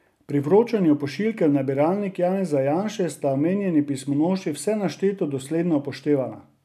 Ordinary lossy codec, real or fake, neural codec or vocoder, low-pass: none; real; none; 14.4 kHz